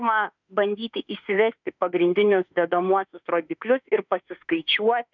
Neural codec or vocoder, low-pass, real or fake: autoencoder, 48 kHz, 32 numbers a frame, DAC-VAE, trained on Japanese speech; 7.2 kHz; fake